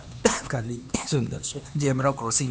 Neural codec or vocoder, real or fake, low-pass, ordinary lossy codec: codec, 16 kHz, 2 kbps, X-Codec, HuBERT features, trained on LibriSpeech; fake; none; none